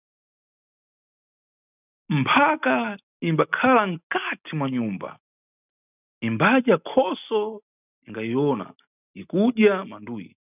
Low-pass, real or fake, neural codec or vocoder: 3.6 kHz; real; none